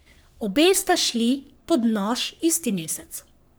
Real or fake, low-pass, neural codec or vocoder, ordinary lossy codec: fake; none; codec, 44.1 kHz, 3.4 kbps, Pupu-Codec; none